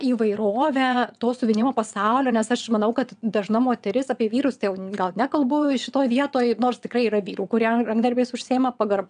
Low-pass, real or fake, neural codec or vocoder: 9.9 kHz; fake; vocoder, 22.05 kHz, 80 mel bands, WaveNeXt